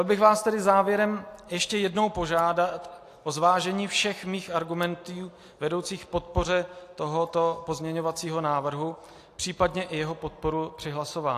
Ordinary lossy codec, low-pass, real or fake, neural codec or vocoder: AAC, 64 kbps; 14.4 kHz; real; none